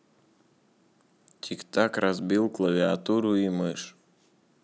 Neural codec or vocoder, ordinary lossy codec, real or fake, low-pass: none; none; real; none